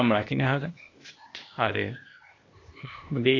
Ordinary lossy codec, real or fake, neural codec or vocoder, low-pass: AAC, 32 kbps; fake; codec, 16 kHz, 0.8 kbps, ZipCodec; 7.2 kHz